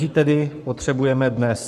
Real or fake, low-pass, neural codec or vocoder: fake; 14.4 kHz; codec, 44.1 kHz, 7.8 kbps, Pupu-Codec